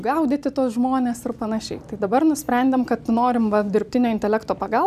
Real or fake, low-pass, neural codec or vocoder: real; 14.4 kHz; none